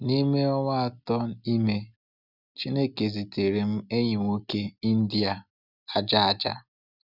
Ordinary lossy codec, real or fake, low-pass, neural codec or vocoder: none; real; 5.4 kHz; none